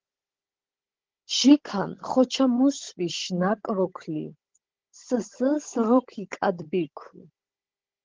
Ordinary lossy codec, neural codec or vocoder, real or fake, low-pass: Opus, 16 kbps; codec, 16 kHz, 16 kbps, FunCodec, trained on Chinese and English, 50 frames a second; fake; 7.2 kHz